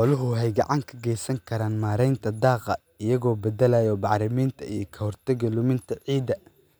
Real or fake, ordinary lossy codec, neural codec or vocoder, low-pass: real; none; none; none